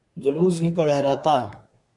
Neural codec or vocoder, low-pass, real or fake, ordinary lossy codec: codec, 24 kHz, 1 kbps, SNAC; 10.8 kHz; fake; MP3, 64 kbps